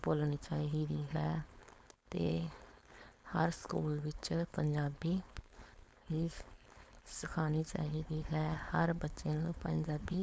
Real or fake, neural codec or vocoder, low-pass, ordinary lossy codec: fake; codec, 16 kHz, 4.8 kbps, FACodec; none; none